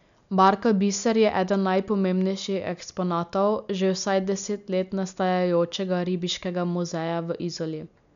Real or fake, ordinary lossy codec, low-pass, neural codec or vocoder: real; none; 7.2 kHz; none